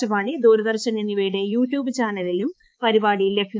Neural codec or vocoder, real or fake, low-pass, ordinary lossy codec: codec, 16 kHz, 4 kbps, X-Codec, HuBERT features, trained on balanced general audio; fake; none; none